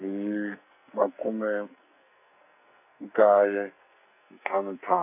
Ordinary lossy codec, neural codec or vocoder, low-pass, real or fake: none; codec, 44.1 kHz, 2.6 kbps, SNAC; 3.6 kHz; fake